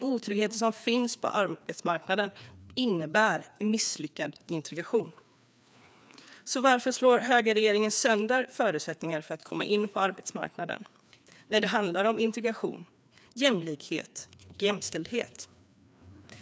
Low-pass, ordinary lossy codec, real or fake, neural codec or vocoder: none; none; fake; codec, 16 kHz, 2 kbps, FreqCodec, larger model